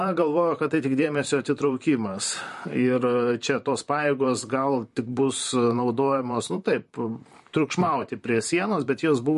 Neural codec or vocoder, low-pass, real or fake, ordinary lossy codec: vocoder, 44.1 kHz, 128 mel bands, Pupu-Vocoder; 14.4 kHz; fake; MP3, 48 kbps